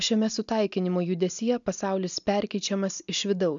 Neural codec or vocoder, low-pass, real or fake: none; 7.2 kHz; real